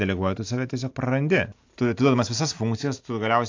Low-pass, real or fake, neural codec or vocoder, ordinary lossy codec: 7.2 kHz; real; none; AAC, 48 kbps